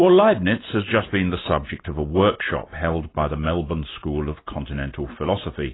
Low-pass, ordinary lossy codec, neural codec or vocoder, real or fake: 7.2 kHz; AAC, 16 kbps; none; real